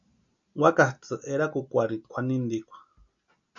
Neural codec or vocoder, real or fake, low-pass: none; real; 7.2 kHz